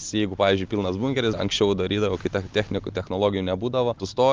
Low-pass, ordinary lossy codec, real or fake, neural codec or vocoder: 7.2 kHz; Opus, 24 kbps; real; none